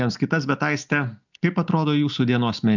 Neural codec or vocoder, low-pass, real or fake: none; 7.2 kHz; real